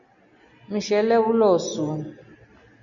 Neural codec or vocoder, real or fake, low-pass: none; real; 7.2 kHz